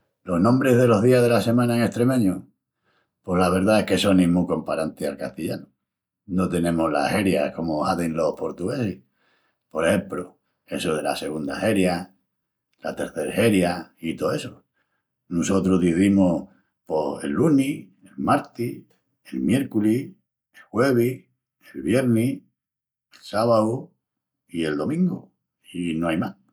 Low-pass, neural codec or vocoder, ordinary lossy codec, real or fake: 19.8 kHz; none; none; real